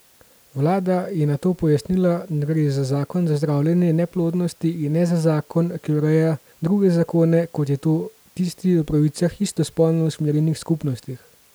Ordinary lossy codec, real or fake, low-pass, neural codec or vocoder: none; real; none; none